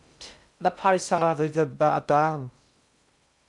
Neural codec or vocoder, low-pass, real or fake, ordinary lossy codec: codec, 16 kHz in and 24 kHz out, 0.6 kbps, FocalCodec, streaming, 2048 codes; 10.8 kHz; fake; MP3, 96 kbps